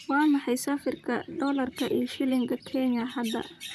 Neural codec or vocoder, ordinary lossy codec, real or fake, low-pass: vocoder, 44.1 kHz, 128 mel bands, Pupu-Vocoder; none; fake; 14.4 kHz